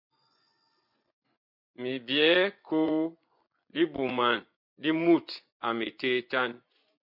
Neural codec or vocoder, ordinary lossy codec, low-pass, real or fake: vocoder, 24 kHz, 100 mel bands, Vocos; MP3, 48 kbps; 5.4 kHz; fake